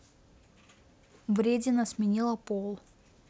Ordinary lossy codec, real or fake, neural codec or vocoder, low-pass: none; real; none; none